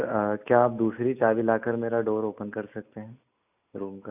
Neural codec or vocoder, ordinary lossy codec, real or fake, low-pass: none; none; real; 3.6 kHz